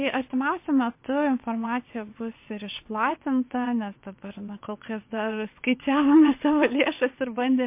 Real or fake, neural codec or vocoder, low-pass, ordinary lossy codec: fake; vocoder, 22.05 kHz, 80 mel bands, WaveNeXt; 3.6 kHz; MP3, 24 kbps